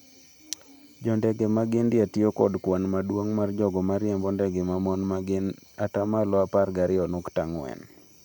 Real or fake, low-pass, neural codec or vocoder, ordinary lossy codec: real; 19.8 kHz; none; none